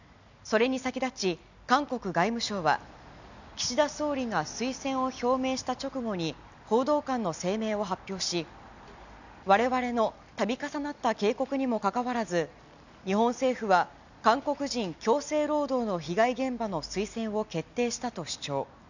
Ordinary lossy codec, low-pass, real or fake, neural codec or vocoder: none; 7.2 kHz; real; none